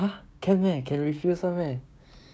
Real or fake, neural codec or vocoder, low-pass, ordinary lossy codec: fake; codec, 16 kHz, 6 kbps, DAC; none; none